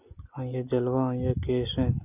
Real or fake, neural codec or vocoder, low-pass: real; none; 3.6 kHz